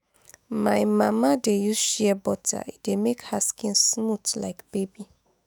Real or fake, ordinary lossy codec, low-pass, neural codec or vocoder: fake; none; none; autoencoder, 48 kHz, 128 numbers a frame, DAC-VAE, trained on Japanese speech